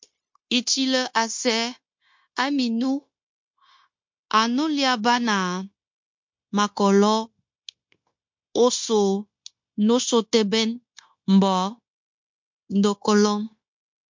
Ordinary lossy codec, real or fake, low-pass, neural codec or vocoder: MP3, 48 kbps; fake; 7.2 kHz; codec, 16 kHz, 0.9 kbps, LongCat-Audio-Codec